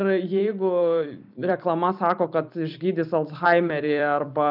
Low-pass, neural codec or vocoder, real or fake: 5.4 kHz; vocoder, 44.1 kHz, 128 mel bands every 256 samples, BigVGAN v2; fake